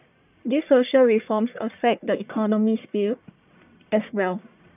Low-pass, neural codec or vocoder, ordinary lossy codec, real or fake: 3.6 kHz; codec, 44.1 kHz, 1.7 kbps, Pupu-Codec; none; fake